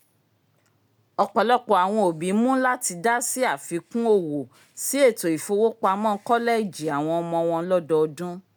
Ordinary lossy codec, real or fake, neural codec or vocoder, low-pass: none; real; none; none